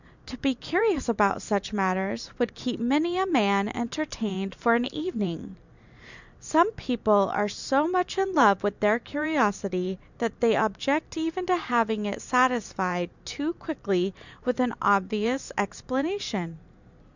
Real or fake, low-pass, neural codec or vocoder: fake; 7.2 kHz; vocoder, 44.1 kHz, 128 mel bands every 512 samples, BigVGAN v2